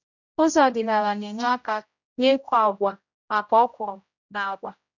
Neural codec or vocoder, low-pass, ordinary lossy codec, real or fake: codec, 16 kHz, 0.5 kbps, X-Codec, HuBERT features, trained on general audio; 7.2 kHz; none; fake